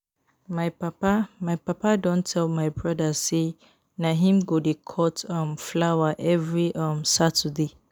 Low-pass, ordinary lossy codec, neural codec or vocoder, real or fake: none; none; none; real